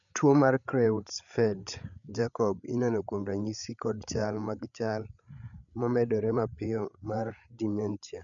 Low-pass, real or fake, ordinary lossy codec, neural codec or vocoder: 7.2 kHz; fake; none; codec, 16 kHz, 8 kbps, FreqCodec, larger model